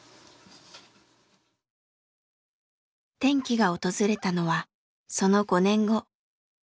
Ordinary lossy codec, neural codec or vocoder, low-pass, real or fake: none; none; none; real